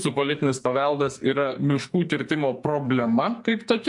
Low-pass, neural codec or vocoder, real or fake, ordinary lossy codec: 10.8 kHz; codec, 44.1 kHz, 2.6 kbps, SNAC; fake; MP3, 64 kbps